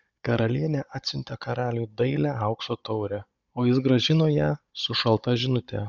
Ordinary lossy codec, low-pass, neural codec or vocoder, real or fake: Opus, 64 kbps; 7.2 kHz; codec, 16 kHz, 16 kbps, FunCodec, trained on Chinese and English, 50 frames a second; fake